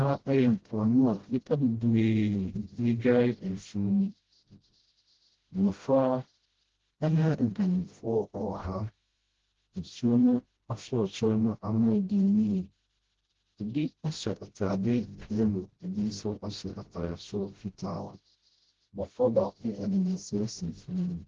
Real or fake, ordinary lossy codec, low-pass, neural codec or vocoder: fake; Opus, 16 kbps; 7.2 kHz; codec, 16 kHz, 0.5 kbps, FreqCodec, smaller model